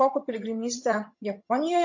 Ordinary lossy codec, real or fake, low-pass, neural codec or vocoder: MP3, 32 kbps; fake; 7.2 kHz; vocoder, 22.05 kHz, 80 mel bands, HiFi-GAN